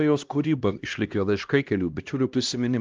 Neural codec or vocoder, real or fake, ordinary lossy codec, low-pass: codec, 16 kHz, 1 kbps, X-Codec, HuBERT features, trained on LibriSpeech; fake; Opus, 32 kbps; 7.2 kHz